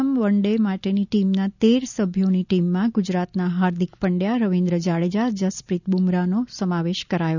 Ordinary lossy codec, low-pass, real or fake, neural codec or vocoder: none; 7.2 kHz; real; none